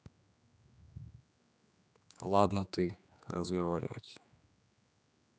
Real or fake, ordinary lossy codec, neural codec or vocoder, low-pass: fake; none; codec, 16 kHz, 2 kbps, X-Codec, HuBERT features, trained on general audio; none